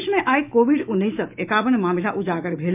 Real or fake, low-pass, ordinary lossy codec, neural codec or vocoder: fake; 3.6 kHz; none; autoencoder, 48 kHz, 128 numbers a frame, DAC-VAE, trained on Japanese speech